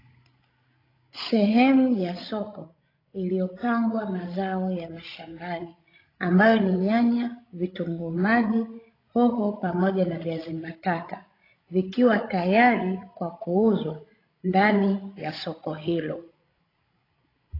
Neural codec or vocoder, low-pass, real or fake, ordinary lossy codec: codec, 16 kHz, 16 kbps, FreqCodec, larger model; 5.4 kHz; fake; AAC, 24 kbps